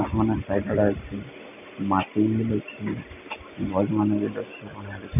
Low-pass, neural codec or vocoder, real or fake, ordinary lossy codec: 3.6 kHz; none; real; none